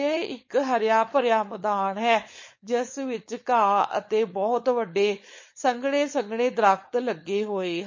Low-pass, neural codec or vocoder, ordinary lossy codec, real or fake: 7.2 kHz; codec, 16 kHz, 4.8 kbps, FACodec; MP3, 32 kbps; fake